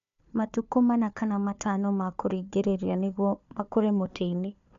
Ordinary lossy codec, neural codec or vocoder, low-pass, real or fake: MP3, 64 kbps; codec, 16 kHz, 4 kbps, FunCodec, trained on Chinese and English, 50 frames a second; 7.2 kHz; fake